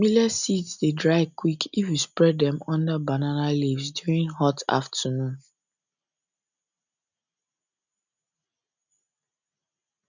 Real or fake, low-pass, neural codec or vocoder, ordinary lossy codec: real; 7.2 kHz; none; none